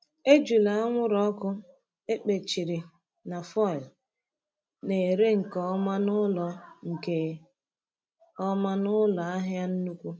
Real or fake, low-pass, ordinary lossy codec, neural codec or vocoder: real; none; none; none